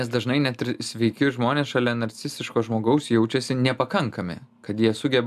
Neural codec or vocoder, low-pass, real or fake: none; 14.4 kHz; real